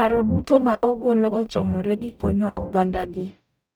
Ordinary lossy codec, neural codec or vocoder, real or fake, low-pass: none; codec, 44.1 kHz, 0.9 kbps, DAC; fake; none